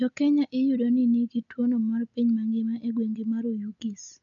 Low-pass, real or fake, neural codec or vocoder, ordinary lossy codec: 7.2 kHz; real; none; none